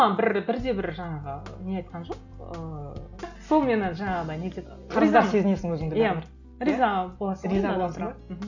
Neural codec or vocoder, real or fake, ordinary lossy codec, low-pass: none; real; none; 7.2 kHz